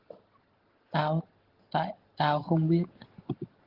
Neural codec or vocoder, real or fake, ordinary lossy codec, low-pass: none; real; Opus, 16 kbps; 5.4 kHz